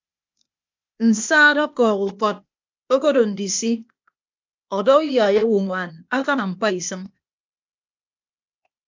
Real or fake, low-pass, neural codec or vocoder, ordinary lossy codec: fake; 7.2 kHz; codec, 16 kHz, 0.8 kbps, ZipCodec; MP3, 64 kbps